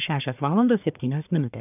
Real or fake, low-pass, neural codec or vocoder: fake; 3.6 kHz; codec, 44.1 kHz, 1.7 kbps, Pupu-Codec